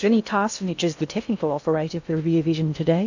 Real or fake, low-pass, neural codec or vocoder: fake; 7.2 kHz; codec, 16 kHz in and 24 kHz out, 0.6 kbps, FocalCodec, streaming, 2048 codes